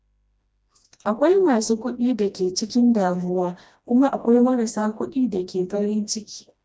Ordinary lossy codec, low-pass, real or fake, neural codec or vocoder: none; none; fake; codec, 16 kHz, 1 kbps, FreqCodec, smaller model